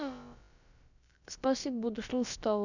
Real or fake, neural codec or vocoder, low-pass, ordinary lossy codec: fake; codec, 16 kHz, about 1 kbps, DyCAST, with the encoder's durations; 7.2 kHz; none